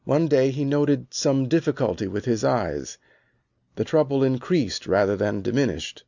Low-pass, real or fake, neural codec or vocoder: 7.2 kHz; real; none